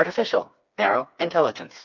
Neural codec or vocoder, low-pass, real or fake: codec, 16 kHz, 4 kbps, FreqCodec, smaller model; 7.2 kHz; fake